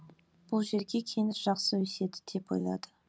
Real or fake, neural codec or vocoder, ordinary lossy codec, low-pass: real; none; none; none